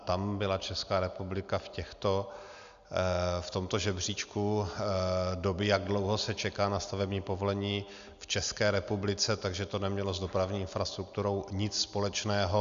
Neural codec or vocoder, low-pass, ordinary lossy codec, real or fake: none; 7.2 kHz; Opus, 64 kbps; real